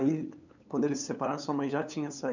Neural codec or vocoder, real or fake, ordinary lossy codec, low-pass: codec, 16 kHz, 8 kbps, FunCodec, trained on LibriTTS, 25 frames a second; fake; none; 7.2 kHz